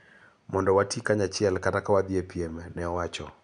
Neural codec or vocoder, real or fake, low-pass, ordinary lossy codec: none; real; 9.9 kHz; none